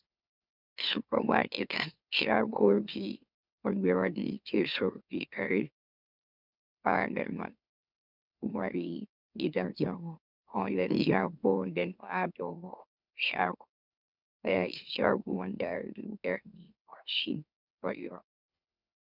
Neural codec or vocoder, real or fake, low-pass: autoencoder, 44.1 kHz, a latent of 192 numbers a frame, MeloTTS; fake; 5.4 kHz